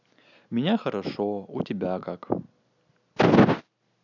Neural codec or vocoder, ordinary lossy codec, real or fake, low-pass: none; none; real; 7.2 kHz